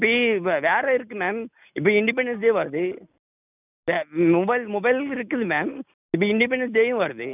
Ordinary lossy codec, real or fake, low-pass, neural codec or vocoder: none; real; 3.6 kHz; none